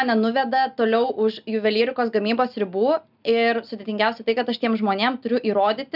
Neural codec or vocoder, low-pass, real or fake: none; 5.4 kHz; real